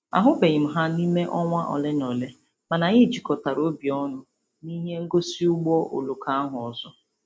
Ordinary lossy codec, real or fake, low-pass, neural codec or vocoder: none; real; none; none